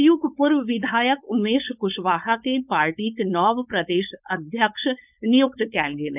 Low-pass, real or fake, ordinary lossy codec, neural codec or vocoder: 3.6 kHz; fake; none; codec, 16 kHz, 4.8 kbps, FACodec